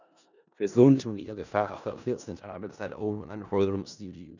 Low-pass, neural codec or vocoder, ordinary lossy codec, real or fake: 7.2 kHz; codec, 16 kHz in and 24 kHz out, 0.4 kbps, LongCat-Audio-Codec, four codebook decoder; none; fake